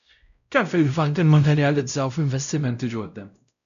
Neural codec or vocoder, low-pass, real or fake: codec, 16 kHz, 0.5 kbps, X-Codec, WavLM features, trained on Multilingual LibriSpeech; 7.2 kHz; fake